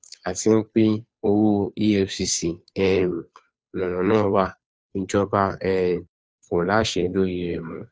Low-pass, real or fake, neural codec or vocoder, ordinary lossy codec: none; fake; codec, 16 kHz, 2 kbps, FunCodec, trained on Chinese and English, 25 frames a second; none